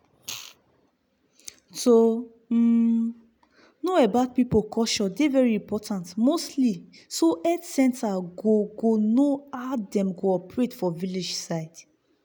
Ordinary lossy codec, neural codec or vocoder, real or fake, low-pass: none; none; real; none